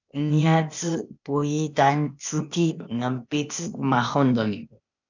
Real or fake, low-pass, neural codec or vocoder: fake; 7.2 kHz; codec, 16 kHz, 0.8 kbps, ZipCodec